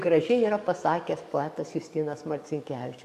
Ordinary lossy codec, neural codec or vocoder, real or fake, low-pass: MP3, 64 kbps; codec, 44.1 kHz, 7.8 kbps, DAC; fake; 14.4 kHz